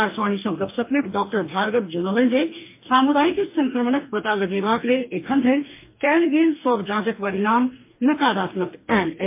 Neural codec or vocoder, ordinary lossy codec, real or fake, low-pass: codec, 44.1 kHz, 2.6 kbps, DAC; MP3, 24 kbps; fake; 3.6 kHz